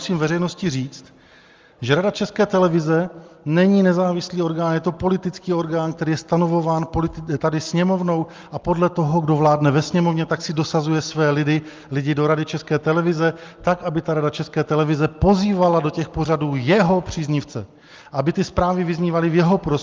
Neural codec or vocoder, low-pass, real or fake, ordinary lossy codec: none; 7.2 kHz; real; Opus, 32 kbps